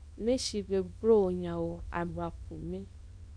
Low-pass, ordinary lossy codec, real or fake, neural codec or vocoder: 9.9 kHz; MP3, 96 kbps; fake; codec, 24 kHz, 0.9 kbps, WavTokenizer, small release